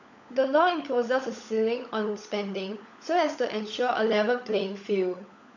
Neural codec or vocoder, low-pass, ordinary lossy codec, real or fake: codec, 16 kHz, 16 kbps, FunCodec, trained on LibriTTS, 50 frames a second; 7.2 kHz; none; fake